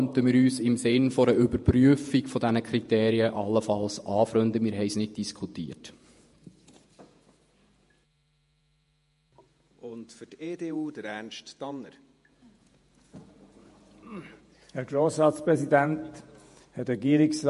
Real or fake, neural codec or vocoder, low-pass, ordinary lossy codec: fake; vocoder, 48 kHz, 128 mel bands, Vocos; 14.4 kHz; MP3, 48 kbps